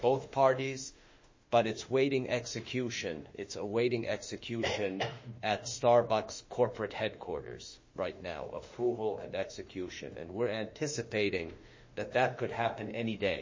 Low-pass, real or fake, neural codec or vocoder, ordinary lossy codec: 7.2 kHz; fake; autoencoder, 48 kHz, 32 numbers a frame, DAC-VAE, trained on Japanese speech; MP3, 32 kbps